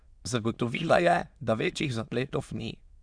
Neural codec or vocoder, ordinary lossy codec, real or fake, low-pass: autoencoder, 22.05 kHz, a latent of 192 numbers a frame, VITS, trained on many speakers; Opus, 64 kbps; fake; 9.9 kHz